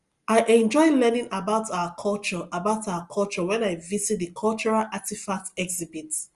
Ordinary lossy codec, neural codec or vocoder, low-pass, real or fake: Opus, 32 kbps; none; 10.8 kHz; real